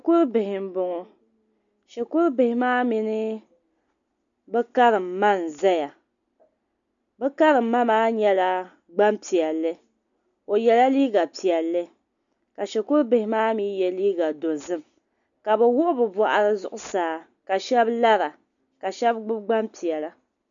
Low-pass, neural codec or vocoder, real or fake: 7.2 kHz; none; real